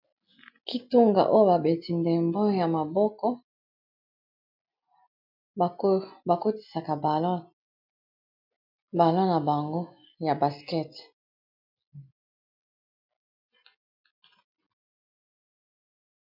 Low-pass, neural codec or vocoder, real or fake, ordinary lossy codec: 5.4 kHz; none; real; MP3, 48 kbps